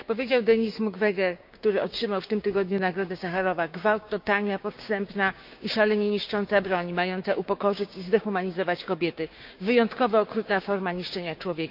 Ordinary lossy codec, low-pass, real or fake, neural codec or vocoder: none; 5.4 kHz; fake; codec, 16 kHz, 6 kbps, DAC